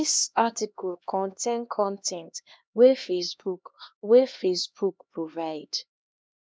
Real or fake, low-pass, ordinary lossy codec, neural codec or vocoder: fake; none; none; codec, 16 kHz, 2 kbps, X-Codec, HuBERT features, trained on LibriSpeech